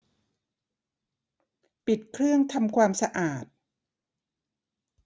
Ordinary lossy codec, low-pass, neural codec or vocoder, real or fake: none; none; none; real